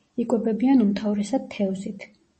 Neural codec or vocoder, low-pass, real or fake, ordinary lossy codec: none; 10.8 kHz; real; MP3, 32 kbps